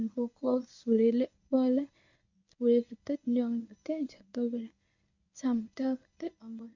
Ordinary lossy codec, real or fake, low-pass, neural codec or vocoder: none; fake; 7.2 kHz; codec, 24 kHz, 0.9 kbps, WavTokenizer, medium speech release version 1